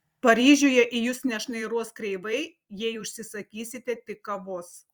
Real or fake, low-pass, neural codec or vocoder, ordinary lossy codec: fake; 19.8 kHz; vocoder, 44.1 kHz, 128 mel bands every 256 samples, BigVGAN v2; Opus, 64 kbps